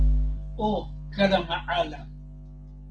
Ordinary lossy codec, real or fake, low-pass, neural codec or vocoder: Opus, 16 kbps; real; 9.9 kHz; none